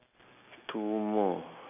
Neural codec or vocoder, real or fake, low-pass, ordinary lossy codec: none; real; 3.6 kHz; none